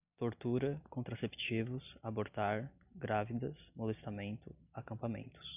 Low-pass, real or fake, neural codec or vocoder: 3.6 kHz; real; none